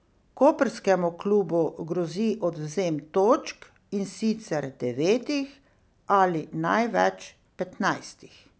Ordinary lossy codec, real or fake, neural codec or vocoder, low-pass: none; real; none; none